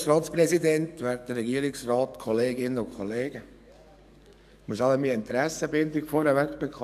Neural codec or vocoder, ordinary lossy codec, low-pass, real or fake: codec, 44.1 kHz, 7.8 kbps, DAC; none; 14.4 kHz; fake